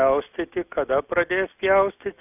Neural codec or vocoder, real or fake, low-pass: none; real; 3.6 kHz